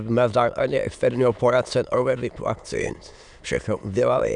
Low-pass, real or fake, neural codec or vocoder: 9.9 kHz; fake; autoencoder, 22.05 kHz, a latent of 192 numbers a frame, VITS, trained on many speakers